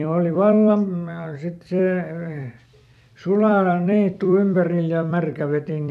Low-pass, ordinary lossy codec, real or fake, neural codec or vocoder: 14.4 kHz; AAC, 96 kbps; fake; vocoder, 44.1 kHz, 128 mel bands every 256 samples, BigVGAN v2